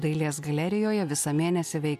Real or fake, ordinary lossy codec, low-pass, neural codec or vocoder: real; MP3, 96 kbps; 14.4 kHz; none